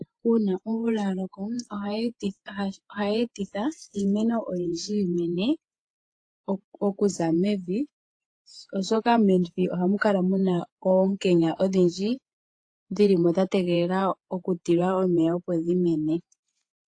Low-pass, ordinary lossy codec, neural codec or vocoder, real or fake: 9.9 kHz; AAC, 48 kbps; vocoder, 48 kHz, 128 mel bands, Vocos; fake